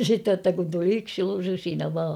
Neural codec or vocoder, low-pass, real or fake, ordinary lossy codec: none; 19.8 kHz; real; none